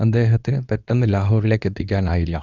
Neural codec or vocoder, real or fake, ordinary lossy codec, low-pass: codec, 24 kHz, 0.9 kbps, WavTokenizer, medium speech release version 2; fake; Opus, 64 kbps; 7.2 kHz